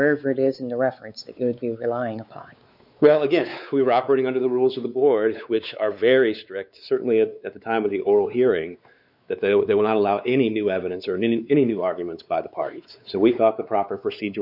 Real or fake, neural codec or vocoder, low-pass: fake; codec, 16 kHz, 4 kbps, X-Codec, WavLM features, trained on Multilingual LibriSpeech; 5.4 kHz